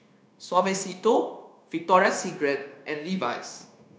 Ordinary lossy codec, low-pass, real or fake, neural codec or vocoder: none; none; fake; codec, 16 kHz, 0.9 kbps, LongCat-Audio-Codec